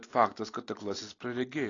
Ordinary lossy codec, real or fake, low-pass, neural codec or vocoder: AAC, 32 kbps; real; 7.2 kHz; none